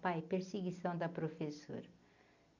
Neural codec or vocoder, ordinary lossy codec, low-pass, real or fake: none; none; 7.2 kHz; real